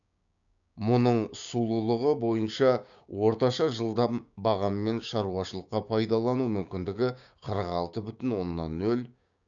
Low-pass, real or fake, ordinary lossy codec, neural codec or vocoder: 7.2 kHz; fake; none; codec, 16 kHz, 6 kbps, DAC